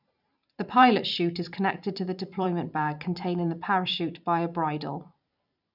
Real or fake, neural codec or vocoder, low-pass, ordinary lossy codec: fake; vocoder, 44.1 kHz, 128 mel bands every 512 samples, BigVGAN v2; 5.4 kHz; none